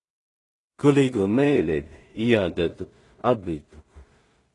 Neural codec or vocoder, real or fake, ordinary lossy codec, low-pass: codec, 16 kHz in and 24 kHz out, 0.4 kbps, LongCat-Audio-Codec, two codebook decoder; fake; AAC, 32 kbps; 10.8 kHz